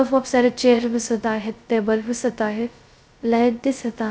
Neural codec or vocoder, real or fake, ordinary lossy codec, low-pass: codec, 16 kHz, 0.2 kbps, FocalCodec; fake; none; none